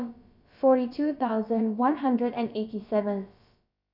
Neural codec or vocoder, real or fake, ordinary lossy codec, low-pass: codec, 16 kHz, about 1 kbps, DyCAST, with the encoder's durations; fake; none; 5.4 kHz